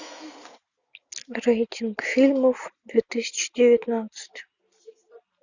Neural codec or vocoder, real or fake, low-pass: none; real; 7.2 kHz